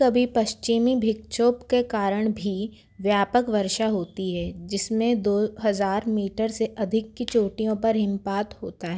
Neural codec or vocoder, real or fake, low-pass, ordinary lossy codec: none; real; none; none